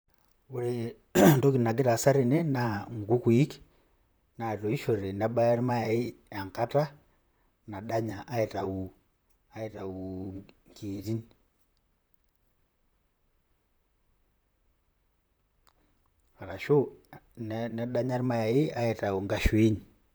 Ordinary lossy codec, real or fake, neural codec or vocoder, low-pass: none; fake; vocoder, 44.1 kHz, 128 mel bands, Pupu-Vocoder; none